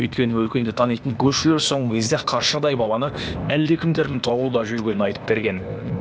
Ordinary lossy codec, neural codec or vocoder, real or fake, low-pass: none; codec, 16 kHz, 0.8 kbps, ZipCodec; fake; none